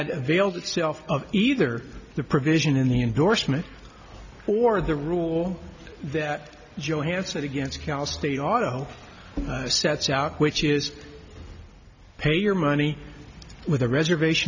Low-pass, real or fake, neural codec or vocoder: 7.2 kHz; real; none